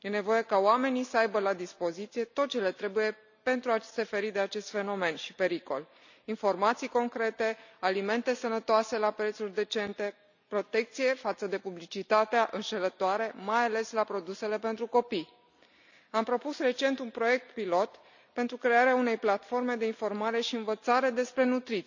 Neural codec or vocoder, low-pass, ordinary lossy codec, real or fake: none; 7.2 kHz; none; real